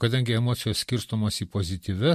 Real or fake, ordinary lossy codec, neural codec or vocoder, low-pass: fake; MP3, 64 kbps; vocoder, 44.1 kHz, 128 mel bands every 256 samples, BigVGAN v2; 14.4 kHz